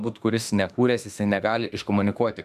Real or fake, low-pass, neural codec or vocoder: fake; 14.4 kHz; autoencoder, 48 kHz, 32 numbers a frame, DAC-VAE, trained on Japanese speech